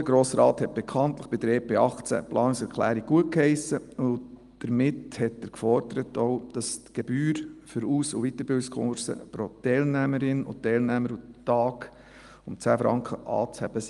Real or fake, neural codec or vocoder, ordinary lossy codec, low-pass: real; none; Opus, 32 kbps; 10.8 kHz